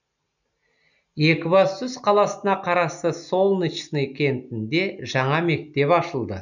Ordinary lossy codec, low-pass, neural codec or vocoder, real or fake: none; 7.2 kHz; none; real